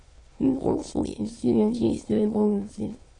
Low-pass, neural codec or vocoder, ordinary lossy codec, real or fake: 9.9 kHz; autoencoder, 22.05 kHz, a latent of 192 numbers a frame, VITS, trained on many speakers; AAC, 32 kbps; fake